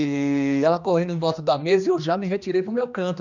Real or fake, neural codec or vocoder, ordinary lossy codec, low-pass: fake; codec, 16 kHz, 2 kbps, X-Codec, HuBERT features, trained on general audio; none; 7.2 kHz